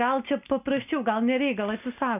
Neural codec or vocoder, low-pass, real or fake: none; 3.6 kHz; real